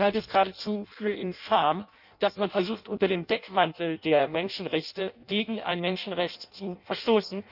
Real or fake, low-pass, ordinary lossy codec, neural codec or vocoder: fake; 5.4 kHz; none; codec, 16 kHz in and 24 kHz out, 0.6 kbps, FireRedTTS-2 codec